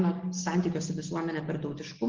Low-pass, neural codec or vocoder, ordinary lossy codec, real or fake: 7.2 kHz; none; Opus, 16 kbps; real